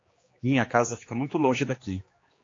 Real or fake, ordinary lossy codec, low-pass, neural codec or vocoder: fake; AAC, 32 kbps; 7.2 kHz; codec, 16 kHz, 2 kbps, X-Codec, HuBERT features, trained on general audio